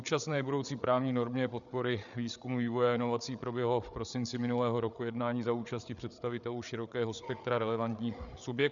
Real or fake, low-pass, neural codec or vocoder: fake; 7.2 kHz; codec, 16 kHz, 8 kbps, FreqCodec, larger model